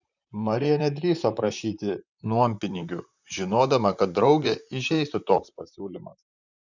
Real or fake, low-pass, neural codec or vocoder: fake; 7.2 kHz; vocoder, 44.1 kHz, 128 mel bands, Pupu-Vocoder